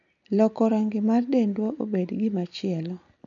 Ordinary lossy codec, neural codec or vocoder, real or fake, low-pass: none; none; real; 7.2 kHz